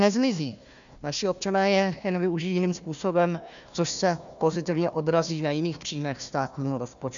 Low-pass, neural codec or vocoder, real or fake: 7.2 kHz; codec, 16 kHz, 1 kbps, FunCodec, trained on Chinese and English, 50 frames a second; fake